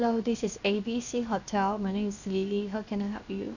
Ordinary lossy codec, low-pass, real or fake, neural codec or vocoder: none; 7.2 kHz; fake; codec, 16 kHz, 0.7 kbps, FocalCodec